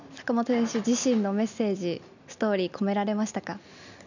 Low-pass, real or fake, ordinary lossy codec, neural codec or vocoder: 7.2 kHz; real; none; none